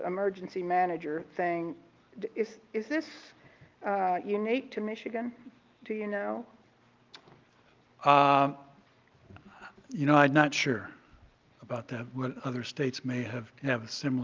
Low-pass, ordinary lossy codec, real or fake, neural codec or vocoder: 7.2 kHz; Opus, 32 kbps; real; none